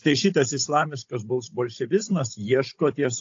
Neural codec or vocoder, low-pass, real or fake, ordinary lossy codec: codec, 16 kHz, 16 kbps, FunCodec, trained on Chinese and English, 50 frames a second; 7.2 kHz; fake; AAC, 48 kbps